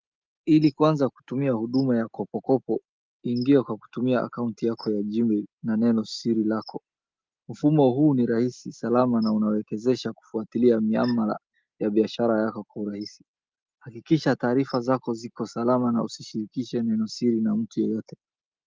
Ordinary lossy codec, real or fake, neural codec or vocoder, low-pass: Opus, 32 kbps; real; none; 7.2 kHz